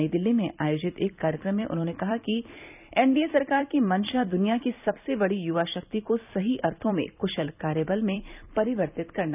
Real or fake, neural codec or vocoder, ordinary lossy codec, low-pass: fake; vocoder, 44.1 kHz, 128 mel bands every 256 samples, BigVGAN v2; none; 3.6 kHz